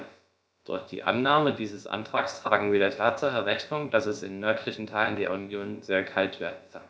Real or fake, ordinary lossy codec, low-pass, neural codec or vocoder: fake; none; none; codec, 16 kHz, about 1 kbps, DyCAST, with the encoder's durations